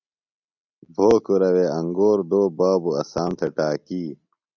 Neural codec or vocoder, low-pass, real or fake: none; 7.2 kHz; real